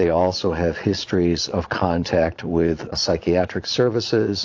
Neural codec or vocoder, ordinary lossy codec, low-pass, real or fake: vocoder, 22.05 kHz, 80 mel bands, Vocos; AAC, 48 kbps; 7.2 kHz; fake